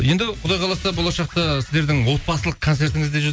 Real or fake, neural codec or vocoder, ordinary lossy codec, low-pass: real; none; none; none